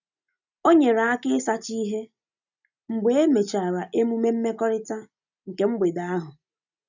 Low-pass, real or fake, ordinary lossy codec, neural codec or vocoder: 7.2 kHz; real; none; none